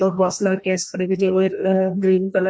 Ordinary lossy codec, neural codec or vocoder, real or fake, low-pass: none; codec, 16 kHz, 1 kbps, FreqCodec, larger model; fake; none